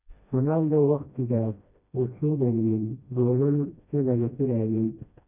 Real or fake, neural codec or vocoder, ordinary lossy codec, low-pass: fake; codec, 16 kHz, 1 kbps, FreqCodec, smaller model; none; 3.6 kHz